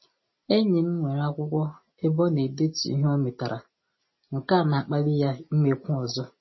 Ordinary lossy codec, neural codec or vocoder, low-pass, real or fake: MP3, 24 kbps; none; 7.2 kHz; real